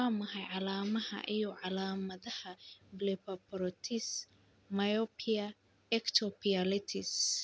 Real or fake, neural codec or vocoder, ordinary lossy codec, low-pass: real; none; none; none